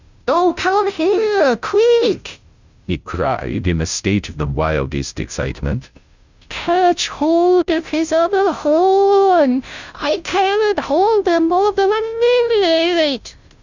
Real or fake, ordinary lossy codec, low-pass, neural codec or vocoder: fake; Opus, 64 kbps; 7.2 kHz; codec, 16 kHz, 0.5 kbps, FunCodec, trained on Chinese and English, 25 frames a second